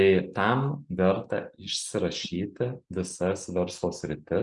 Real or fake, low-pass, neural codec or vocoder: real; 10.8 kHz; none